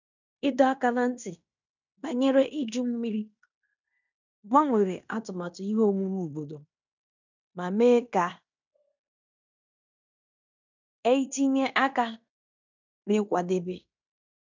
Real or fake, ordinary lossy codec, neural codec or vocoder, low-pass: fake; none; codec, 16 kHz in and 24 kHz out, 0.9 kbps, LongCat-Audio-Codec, fine tuned four codebook decoder; 7.2 kHz